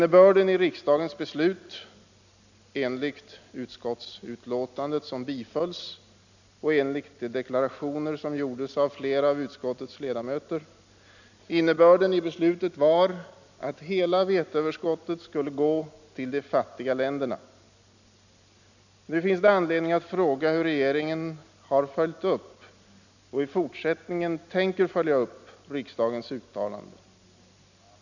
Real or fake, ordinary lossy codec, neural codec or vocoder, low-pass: real; none; none; 7.2 kHz